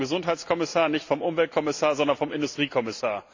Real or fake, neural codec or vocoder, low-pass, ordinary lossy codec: real; none; 7.2 kHz; AAC, 48 kbps